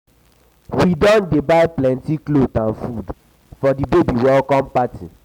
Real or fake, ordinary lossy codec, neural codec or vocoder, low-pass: real; none; none; 19.8 kHz